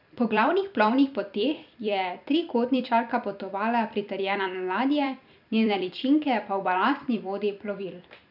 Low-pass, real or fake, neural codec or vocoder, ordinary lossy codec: 5.4 kHz; fake; vocoder, 44.1 kHz, 128 mel bands every 256 samples, BigVGAN v2; none